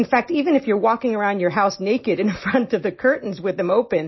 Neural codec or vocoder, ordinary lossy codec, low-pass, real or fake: none; MP3, 24 kbps; 7.2 kHz; real